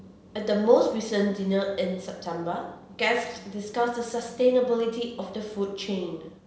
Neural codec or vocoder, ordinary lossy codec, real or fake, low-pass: none; none; real; none